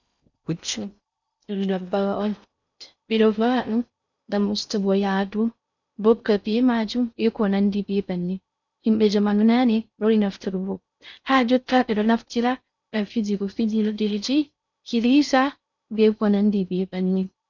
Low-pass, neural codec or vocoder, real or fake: 7.2 kHz; codec, 16 kHz in and 24 kHz out, 0.6 kbps, FocalCodec, streaming, 4096 codes; fake